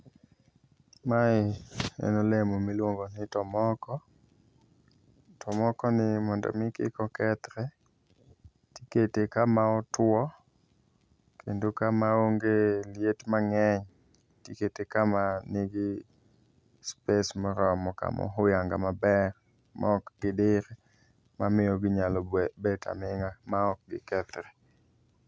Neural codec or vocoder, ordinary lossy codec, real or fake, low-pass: none; none; real; none